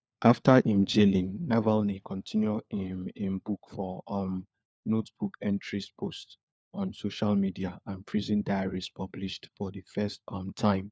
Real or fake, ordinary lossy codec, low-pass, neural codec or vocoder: fake; none; none; codec, 16 kHz, 4 kbps, FunCodec, trained on LibriTTS, 50 frames a second